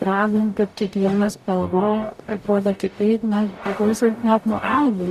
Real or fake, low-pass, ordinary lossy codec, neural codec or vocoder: fake; 14.4 kHz; Opus, 64 kbps; codec, 44.1 kHz, 0.9 kbps, DAC